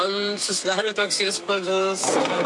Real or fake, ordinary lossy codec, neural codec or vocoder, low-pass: fake; MP3, 64 kbps; codec, 32 kHz, 1.9 kbps, SNAC; 10.8 kHz